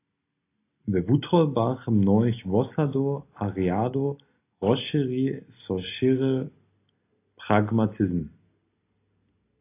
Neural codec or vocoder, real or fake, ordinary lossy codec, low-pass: none; real; AAC, 24 kbps; 3.6 kHz